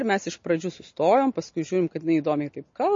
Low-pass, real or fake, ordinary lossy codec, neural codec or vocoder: 7.2 kHz; real; MP3, 32 kbps; none